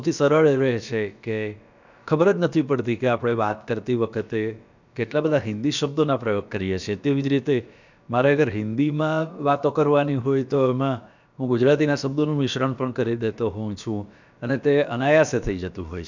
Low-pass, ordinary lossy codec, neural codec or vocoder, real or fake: 7.2 kHz; none; codec, 16 kHz, about 1 kbps, DyCAST, with the encoder's durations; fake